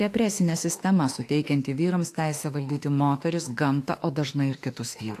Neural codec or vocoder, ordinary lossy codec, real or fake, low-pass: autoencoder, 48 kHz, 32 numbers a frame, DAC-VAE, trained on Japanese speech; AAC, 64 kbps; fake; 14.4 kHz